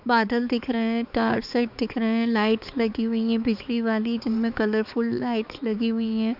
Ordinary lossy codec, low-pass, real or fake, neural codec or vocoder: none; 5.4 kHz; fake; codec, 16 kHz, 4 kbps, X-Codec, HuBERT features, trained on balanced general audio